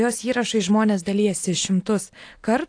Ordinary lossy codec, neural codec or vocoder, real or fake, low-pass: AAC, 48 kbps; none; real; 9.9 kHz